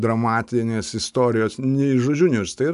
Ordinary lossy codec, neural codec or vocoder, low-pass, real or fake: AAC, 96 kbps; none; 10.8 kHz; real